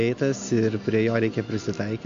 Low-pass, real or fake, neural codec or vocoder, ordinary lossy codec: 7.2 kHz; real; none; AAC, 64 kbps